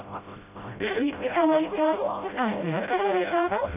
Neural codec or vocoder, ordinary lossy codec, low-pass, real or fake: codec, 16 kHz, 0.5 kbps, FreqCodec, smaller model; none; 3.6 kHz; fake